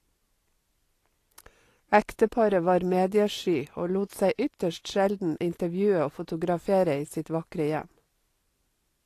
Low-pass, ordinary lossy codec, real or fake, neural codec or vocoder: 14.4 kHz; AAC, 48 kbps; real; none